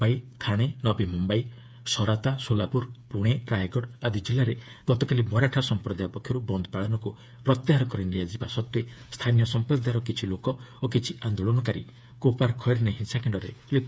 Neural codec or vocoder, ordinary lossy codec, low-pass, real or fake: codec, 16 kHz, 8 kbps, FreqCodec, smaller model; none; none; fake